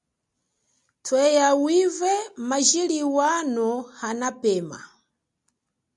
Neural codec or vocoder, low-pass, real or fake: none; 10.8 kHz; real